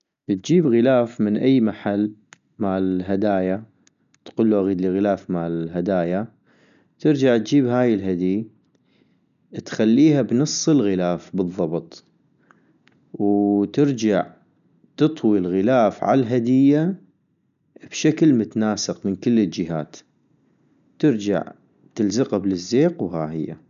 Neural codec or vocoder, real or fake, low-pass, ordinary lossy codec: none; real; 7.2 kHz; none